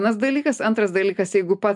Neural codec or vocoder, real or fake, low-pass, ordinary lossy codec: none; real; 10.8 kHz; MP3, 64 kbps